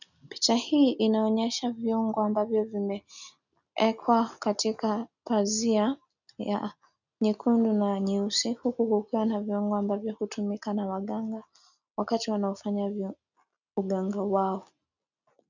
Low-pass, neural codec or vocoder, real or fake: 7.2 kHz; none; real